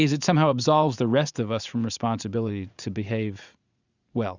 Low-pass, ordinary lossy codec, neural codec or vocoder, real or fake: 7.2 kHz; Opus, 64 kbps; vocoder, 44.1 kHz, 80 mel bands, Vocos; fake